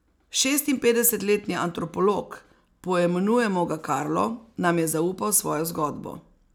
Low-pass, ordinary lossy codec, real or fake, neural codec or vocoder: none; none; real; none